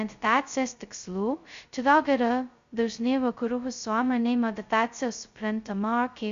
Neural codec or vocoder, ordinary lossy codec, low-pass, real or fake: codec, 16 kHz, 0.2 kbps, FocalCodec; Opus, 64 kbps; 7.2 kHz; fake